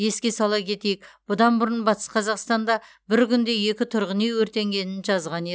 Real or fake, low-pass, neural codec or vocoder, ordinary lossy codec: real; none; none; none